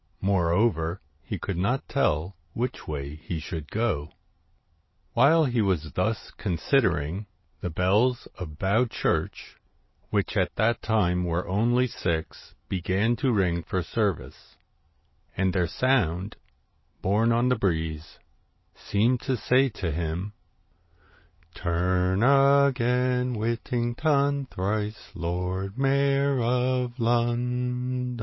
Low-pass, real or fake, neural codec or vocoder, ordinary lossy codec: 7.2 kHz; real; none; MP3, 24 kbps